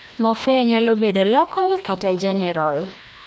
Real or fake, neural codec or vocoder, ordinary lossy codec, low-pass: fake; codec, 16 kHz, 1 kbps, FreqCodec, larger model; none; none